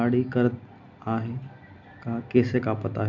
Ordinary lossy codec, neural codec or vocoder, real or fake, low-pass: none; none; real; 7.2 kHz